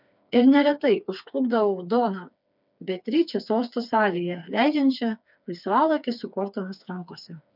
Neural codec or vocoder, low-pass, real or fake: codec, 16 kHz, 4 kbps, FreqCodec, smaller model; 5.4 kHz; fake